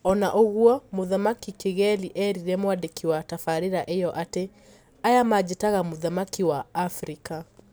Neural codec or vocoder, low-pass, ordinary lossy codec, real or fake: none; none; none; real